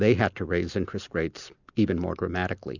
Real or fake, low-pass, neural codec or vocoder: real; 7.2 kHz; none